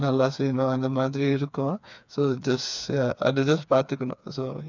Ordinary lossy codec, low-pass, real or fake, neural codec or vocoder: none; 7.2 kHz; fake; codec, 16 kHz, 4 kbps, FreqCodec, smaller model